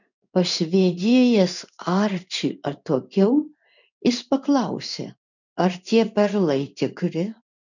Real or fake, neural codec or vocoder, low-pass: fake; codec, 16 kHz in and 24 kHz out, 1 kbps, XY-Tokenizer; 7.2 kHz